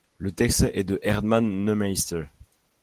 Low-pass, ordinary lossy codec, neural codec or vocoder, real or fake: 14.4 kHz; Opus, 16 kbps; none; real